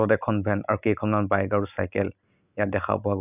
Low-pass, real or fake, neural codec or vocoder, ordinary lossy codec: 3.6 kHz; real; none; none